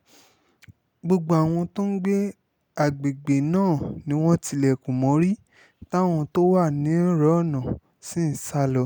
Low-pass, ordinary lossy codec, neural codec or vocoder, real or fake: 19.8 kHz; none; none; real